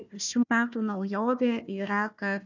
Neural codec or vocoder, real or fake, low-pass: codec, 16 kHz, 1 kbps, FunCodec, trained on Chinese and English, 50 frames a second; fake; 7.2 kHz